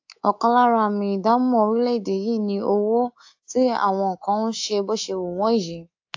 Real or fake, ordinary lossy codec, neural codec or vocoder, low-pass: fake; AAC, 48 kbps; codec, 24 kHz, 3.1 kbps, DualCodec; 7.2 kHz